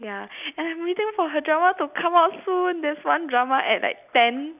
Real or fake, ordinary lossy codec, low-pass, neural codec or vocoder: real; none; 3.6 kHz; none